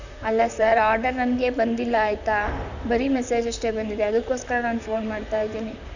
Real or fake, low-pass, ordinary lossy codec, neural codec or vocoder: fake; 7.2 kHz; none; vocoder, 44.1 kHz, 128 mel bands, Pupu-Vocoder